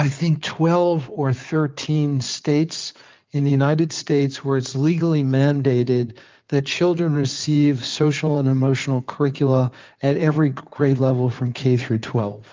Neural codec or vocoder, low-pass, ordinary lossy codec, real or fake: codec, 16 kHz in and 24 kHz out, 2.2 kbps, FireRedTTS-2 codec; 7.2 kHz; Opus, 24 kbps; fake